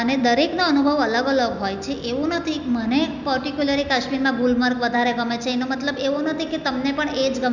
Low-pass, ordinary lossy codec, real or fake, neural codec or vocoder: 7.2 kHz; none; real; none